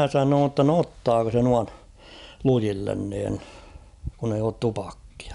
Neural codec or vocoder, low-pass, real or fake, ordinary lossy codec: none; 10.8 kHz; real; none